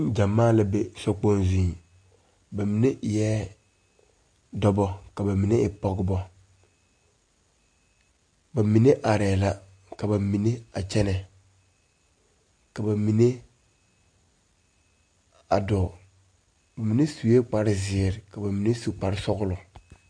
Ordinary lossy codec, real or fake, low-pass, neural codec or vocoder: MP3, 48 kbps; real; 9.9 kHz; none